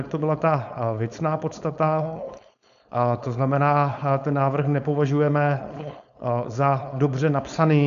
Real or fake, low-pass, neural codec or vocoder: fake; 7.2 kHz; codec, 16 kHz, 4.8 kbps, FACodec